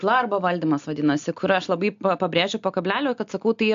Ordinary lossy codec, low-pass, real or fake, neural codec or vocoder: AAC, 64 kbps; 7.2 kHz; real; none